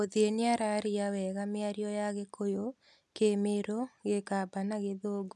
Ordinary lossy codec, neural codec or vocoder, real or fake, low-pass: none; none; real; none